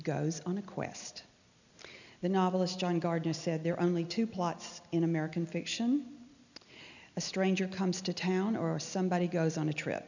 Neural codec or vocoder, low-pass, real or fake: none; 7.2 kHz; real